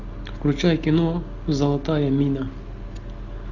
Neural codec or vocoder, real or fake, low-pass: none; real; 7.2 kHz